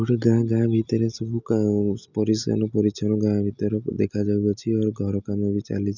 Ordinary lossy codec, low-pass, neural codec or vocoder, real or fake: none; 7.2 kHz; none; real